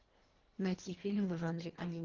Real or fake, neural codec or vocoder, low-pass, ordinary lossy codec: fake; codec, 24 kHz, 1.5 kbps, HILCodec; 7.2 kHz; Opus, 16 kbps